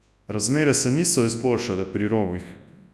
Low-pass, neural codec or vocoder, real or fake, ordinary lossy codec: none; codec, 24 kHz, 0.9 kbps, WavTokenizer, large speech release; fake; none